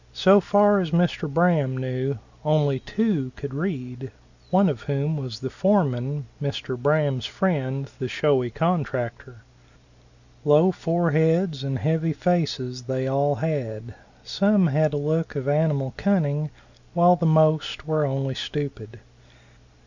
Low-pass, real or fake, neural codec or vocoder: 7.2 kHz; real; none